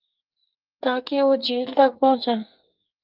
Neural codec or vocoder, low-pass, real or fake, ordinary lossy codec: codec, 44.1 kHz, 2.6 kbps, SNAC; 5.4 kHz; fake; Opus, 32 kbps